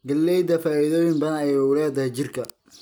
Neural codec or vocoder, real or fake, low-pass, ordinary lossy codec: none; real; none; none